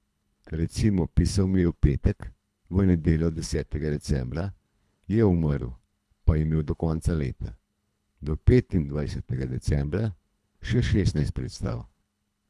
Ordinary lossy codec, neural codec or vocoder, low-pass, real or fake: none; codec, 24 kHz, 3 kbps, HILCodec; none; fake